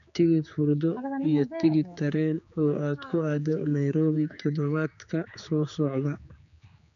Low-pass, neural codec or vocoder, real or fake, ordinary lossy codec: 7.2 kHz; codec, 16 kHz, 4 kbps, X-Codec, HuBERT features, trained on general audio; fake; none